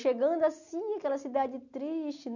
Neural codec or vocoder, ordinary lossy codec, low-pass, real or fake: none; none; 7.2 kHz; real